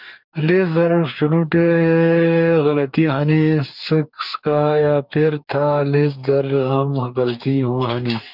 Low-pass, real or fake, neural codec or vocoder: 5.4 kHz; fake; codec, 44.1 kHz, 2.6 kbps, DAC